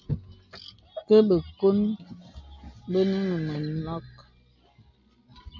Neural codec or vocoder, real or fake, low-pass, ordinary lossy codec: none; real; 7.2 kHz; MP3, 64 kbps